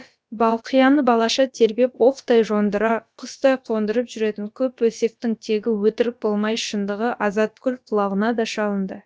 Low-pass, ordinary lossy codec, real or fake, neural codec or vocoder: none; none; fake; codec, 16 kHz, about 1 kbps, DyCAST, with the encoder's durations